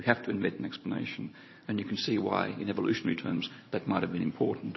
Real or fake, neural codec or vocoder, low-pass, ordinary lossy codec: fake; vocoder, 44.1 kHz, 128 mel bands every 512 samples, BigVGAN v2; 7.2 kHz; MP3, 24 kbps